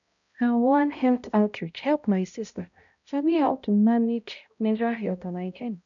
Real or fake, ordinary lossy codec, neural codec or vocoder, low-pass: fake; MP3, 96 kbps; codec, 16 kHz, 0.5 kbps, X-Codec, HuBERT features, trained on balanced general audio; 7.2 kHz